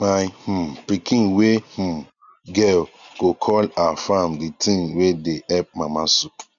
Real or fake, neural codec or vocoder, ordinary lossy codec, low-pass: real; none; none; 7.2 kHz